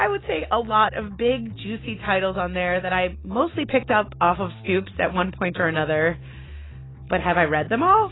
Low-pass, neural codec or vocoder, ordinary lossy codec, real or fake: 7.2 kHz; none; AAC, 16 kbps; real